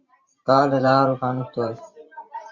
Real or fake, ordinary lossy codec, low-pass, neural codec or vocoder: fake; Opus, 64 kbps; 7.2 kHz; vocoder, 44.1 kHz, 128 mel bands every 512 samples, BigVGAN v2